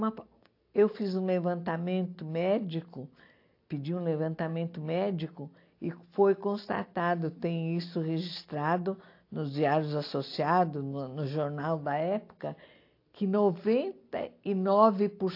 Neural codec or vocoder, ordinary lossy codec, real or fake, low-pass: none; AAC, 32 kbps; real; 5.4 kHz